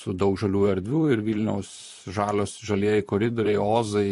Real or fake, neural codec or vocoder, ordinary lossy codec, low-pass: fake; vocoder, 44.1 kHz, 128 mel bands, Pupu-Vocoder; MP3, 48 kbps; 14.4 kHz